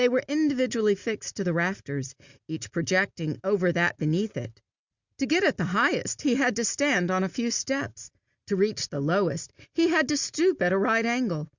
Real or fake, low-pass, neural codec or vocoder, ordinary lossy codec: real; 7.2 kHz; none; Opus, 64 kbps